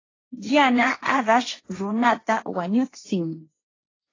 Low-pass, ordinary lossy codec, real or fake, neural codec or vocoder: 7.2 kHz; AAC, 32 kbps; fake; codec, 16 kHz, 1.1 kbps, Voila-Tokenizer